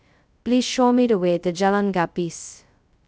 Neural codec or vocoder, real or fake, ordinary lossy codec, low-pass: codec, 16 kHz, 0.2 kbps, FocalCodec; fake; none; none